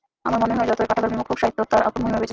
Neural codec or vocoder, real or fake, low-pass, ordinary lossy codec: none; real; 7.2 kHz; Opus, 16 kbps